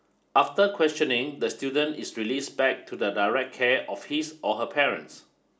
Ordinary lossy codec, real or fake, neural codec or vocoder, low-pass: none; real; none; none